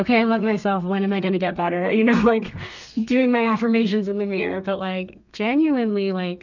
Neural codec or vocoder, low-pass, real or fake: codec, 32 kHz, 1.9 kbps, SNAC; 7.2 kHz; fake